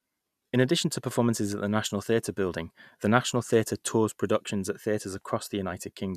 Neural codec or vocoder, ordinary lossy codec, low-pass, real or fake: none; none; 14.4 kHz; real